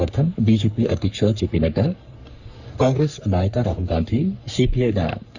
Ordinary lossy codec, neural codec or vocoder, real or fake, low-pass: Opus, 64 kbps; codec, 44.1 kHz, 3.4 kbps, Pupu-Codec; fake; 7.2 kHz